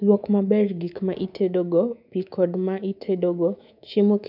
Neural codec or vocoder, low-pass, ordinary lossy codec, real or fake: codec, 24 kHz, 3.1 kbps, DualCodec; 5.4 kHz; none; fake